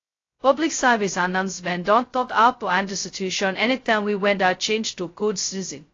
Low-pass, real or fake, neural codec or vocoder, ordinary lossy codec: 7.2 kHz; fake; codec, 16 kHz, 0.2 kbps, FocalCodec; AAC, 32 kbps